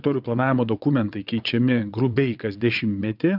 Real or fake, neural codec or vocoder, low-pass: fake; vocoder, 22.05 kHz, 80 mel bands, WaveNeXt; 5.4 kHz